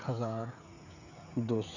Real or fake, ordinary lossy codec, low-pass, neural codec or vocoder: fake; none; 7.2 kHz; codec, 16 kHz, 16 kbps, FreqCodec, smaller model